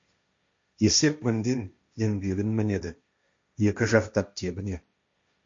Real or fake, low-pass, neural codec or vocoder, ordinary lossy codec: fake; 7.2 kHz; codec, 16 kHz, 1.1 kbps, Voila-Tokenizer; MP3, 48 kbps